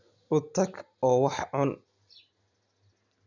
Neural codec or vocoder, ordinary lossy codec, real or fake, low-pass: none; none; real; 7.2 kHz